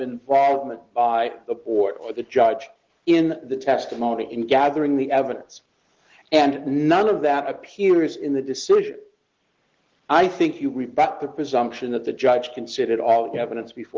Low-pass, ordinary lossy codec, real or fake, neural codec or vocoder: 7.2 kHz; Opus, 16 kbps; real; none